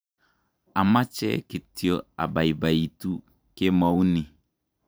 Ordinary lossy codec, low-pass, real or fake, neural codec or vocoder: none; none; fake; vocoder, 44.1 kHz, 128 mel bands every 512 samples, BigVGAN v2